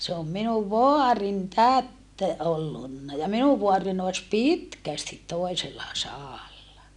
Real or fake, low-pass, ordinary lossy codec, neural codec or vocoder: real; 10.8 kHz; none; none